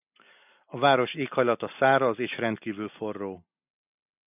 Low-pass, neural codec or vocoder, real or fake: 3.6 kHz; none; real